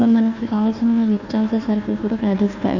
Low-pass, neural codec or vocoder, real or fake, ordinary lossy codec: 7.2 kHz; codec, 16 kHz, 1 kbps, FunCodec, trained on Chinese and English, 50 frames a second; fake; none